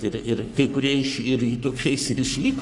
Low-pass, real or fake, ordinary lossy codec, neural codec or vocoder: 10.8 kHz; fake; AAC, 64 kbps; codec, 44.1 kHz, 3.4 kbps, Pupu-Codec